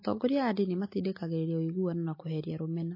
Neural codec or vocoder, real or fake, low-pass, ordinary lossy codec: none; real; 5.4 kHz; MP3, 24 kbps